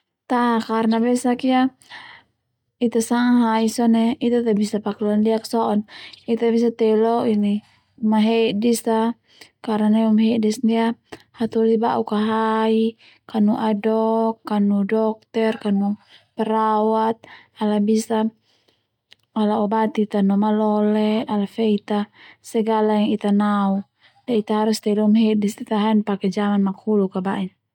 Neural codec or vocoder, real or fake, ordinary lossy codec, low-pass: none; real; none; 19.8 kHz